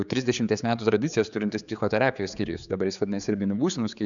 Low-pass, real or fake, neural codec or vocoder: 7.2 kHz; fake; codec, 16 kHz, 4 kbps, X-Codec, HuBERT features, trained on general audio